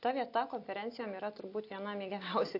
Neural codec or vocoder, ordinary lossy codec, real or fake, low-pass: none; AAC, 32 kbps; real; 5.4 kHz